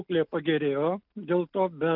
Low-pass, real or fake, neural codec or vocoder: 5.4 kHz; real; none